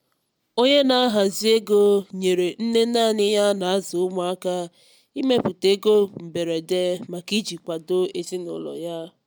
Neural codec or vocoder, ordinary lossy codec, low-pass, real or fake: none; none; none; real